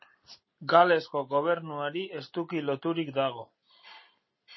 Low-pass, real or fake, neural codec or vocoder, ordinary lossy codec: 7.2 kHz; real; none; MP3, 24 kbps